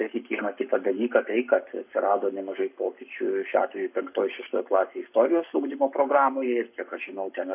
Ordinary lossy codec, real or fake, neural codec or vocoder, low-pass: AAC, 32 kbps; fake; codec, 44.1 kHz, 7.8 kbps, Pupu-Codec; 3.6 kHz